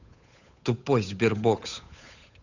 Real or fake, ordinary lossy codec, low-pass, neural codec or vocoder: fake; none; 7.2 kHz; codec, 16 kHz, 8 kbps, FunCodec, trained on Chinese and English, 25 frames a second